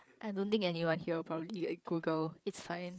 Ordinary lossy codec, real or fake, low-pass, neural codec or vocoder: none; fake; none; codec, 16 kHz, 8 kbps, FreqCodec, larger model